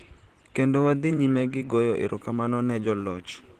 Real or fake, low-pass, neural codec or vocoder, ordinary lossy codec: fake; 14.4 kHz; vocoder, 44.1 kHz, 128 mel bands, Pupu-Vocoder; Opus, 16 kbps